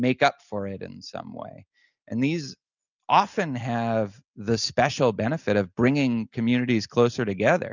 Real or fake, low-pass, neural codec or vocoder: real; 7.2 kHz; none